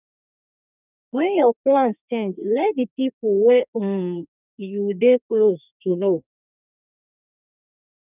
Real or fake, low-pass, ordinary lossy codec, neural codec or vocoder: fake; 3.6 kHz; none; codec, 32 kHz, 1.9 kbps, SNAC